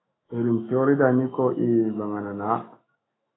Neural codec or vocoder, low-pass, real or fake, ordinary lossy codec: autoencoder, 48 kHz, 128 numbers a frame, DAC-VAE, trained on Japanese speech; 7.2 kHz; fake; AAC, 16 kbps